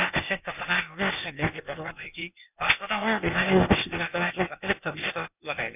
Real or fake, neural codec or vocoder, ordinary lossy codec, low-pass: fake; codec, 16 kHz, 0.8 kbps, ZipCodec; none; 3.6 kHz